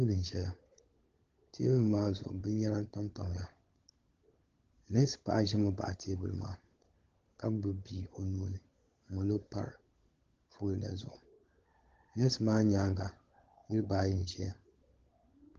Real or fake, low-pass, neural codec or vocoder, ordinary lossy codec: fake; 7.2 kHz; codec, 16 kHz, 16 kbps, FunCodec, trained on LibriTTS, 50 frames a second; Opus, 16 kbps